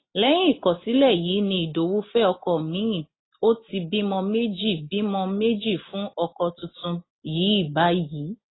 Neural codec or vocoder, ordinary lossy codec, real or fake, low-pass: none; AAC, 16 kbps; real; 7.2 kHz